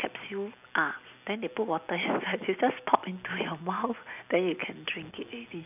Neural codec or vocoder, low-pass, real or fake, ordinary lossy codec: none; 3.6 kHz; real; none